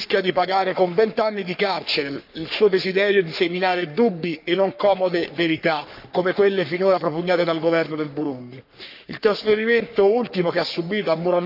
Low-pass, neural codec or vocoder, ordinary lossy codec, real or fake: 5.4 kHz; codec, 44.1 kHz, 3.4 kbps, Pupu-Codec; none; fake